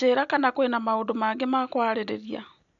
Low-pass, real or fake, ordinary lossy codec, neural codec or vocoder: 7.2 kHz; real; none; none